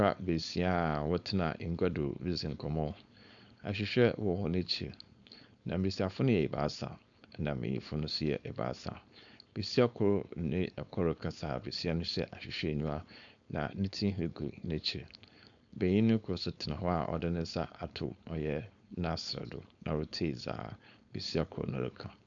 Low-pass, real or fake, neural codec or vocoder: 7.2 kHz; fake; codec, 16 kHz, 4.8 kbps, FACodec